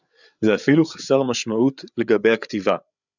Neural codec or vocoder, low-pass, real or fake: codec, 16 kHz, 8 kbps, FreqCodec, larger model; 7.2 kHz; fake